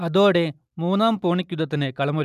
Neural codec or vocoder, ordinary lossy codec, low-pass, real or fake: none; none; 14.4 kHz; real